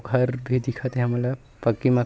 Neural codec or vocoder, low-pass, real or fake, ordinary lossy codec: none; none; real; none